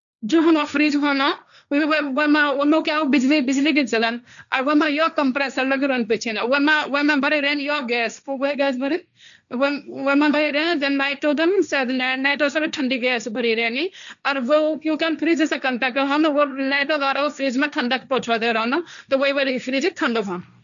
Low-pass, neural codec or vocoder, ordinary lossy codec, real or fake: 7.2 kHz; codec, 16 kHz, 1.1 kbps, Voila-Tokenizer; none; fake